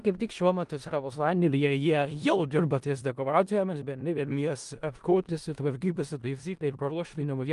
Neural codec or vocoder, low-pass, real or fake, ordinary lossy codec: codec, 16 kHz in and 24 kHz out, 0.4 kbps, LongCat-Audio-Codec, four codebook decoder; 10.8 kHz; fake; Opus, 24 kbps